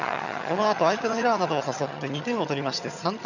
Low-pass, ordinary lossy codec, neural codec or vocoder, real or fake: 7.2 kHz; none; vocoder, 22.05 kHz, 80 mel bands, HiFi-GAN; fake